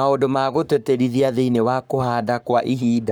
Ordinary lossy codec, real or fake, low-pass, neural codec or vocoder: none; fake; none; codec, 44.1 kHz, 7.8 kbps, Pupu-Codec